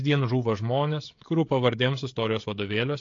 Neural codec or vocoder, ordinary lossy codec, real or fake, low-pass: codec, 16 kHz, 16 kbps, FreqCodec, smaller model; AAC, 48 kbps; fake; 7.2 kHz